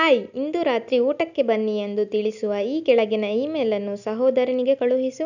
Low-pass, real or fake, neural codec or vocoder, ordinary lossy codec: 7.2 kHz; real; none; none